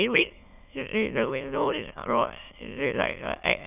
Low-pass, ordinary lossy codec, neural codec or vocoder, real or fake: 3.6 kHz; none; autoencoder, 22.05 kHz, a latent of 192 numbers a frame, VITS, trained on many speakers; fake